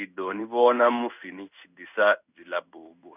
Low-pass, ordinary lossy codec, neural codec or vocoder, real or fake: 3.6 kHz; none; codec, 16 kHz in and 24 kHz out, 1 kbps, XY-Tokenizer; fake